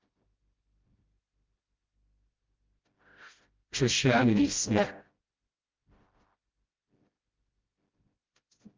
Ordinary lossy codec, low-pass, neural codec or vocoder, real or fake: Opus, 32 kbps; 7.2 kHz; codec, 16 kHz, 0.5 kbps, FreqCodec, smaller model; fake